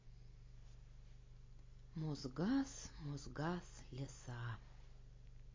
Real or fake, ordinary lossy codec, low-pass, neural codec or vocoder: real; MP3, 32 kbps; 7.2 kHz; none